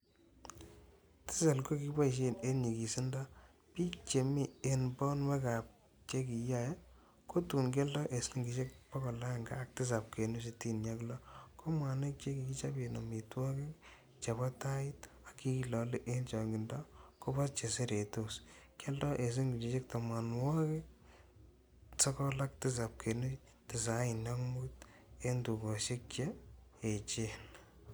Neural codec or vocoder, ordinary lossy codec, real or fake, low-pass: none; none; real; none